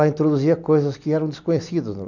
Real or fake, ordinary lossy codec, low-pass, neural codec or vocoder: real; none; 7.2 kHz; none